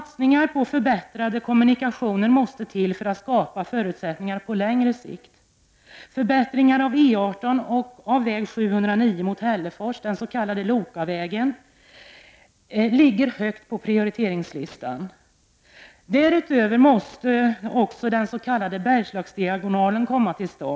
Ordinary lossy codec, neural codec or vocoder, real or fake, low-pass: none; none; real; none